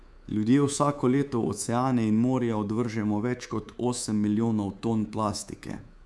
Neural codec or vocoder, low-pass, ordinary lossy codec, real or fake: codec, 24 kHz, 3.1 kbps, DualCodec; none; none; fake